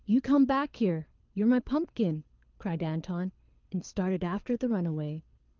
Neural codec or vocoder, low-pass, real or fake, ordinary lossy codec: codec, 24 kHz, 6 kbps, HILCodec; 7.2 kHz; fake; Opus, 24 kbps